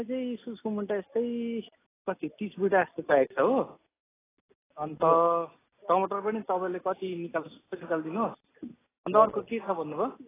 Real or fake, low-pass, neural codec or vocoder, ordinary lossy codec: real; 3.6 kHz; none; AAC, 16 kbps